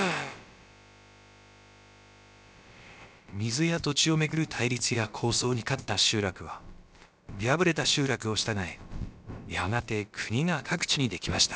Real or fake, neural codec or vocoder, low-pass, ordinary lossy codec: fake; codec, 16 kHz, about 1 kbps, DyCAST, with the encoder's durations; none; none